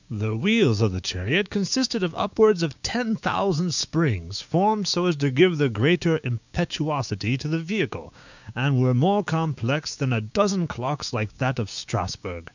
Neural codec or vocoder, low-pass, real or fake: codec, 16 kHz, 6 kbps, DAC; 7.2 kHz; fake